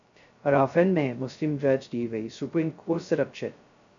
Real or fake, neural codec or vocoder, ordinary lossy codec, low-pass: fake; codec, 16 kHz, 0.2 kbps, FocalCodec; AAC, 64 kbps; 7.2 kHz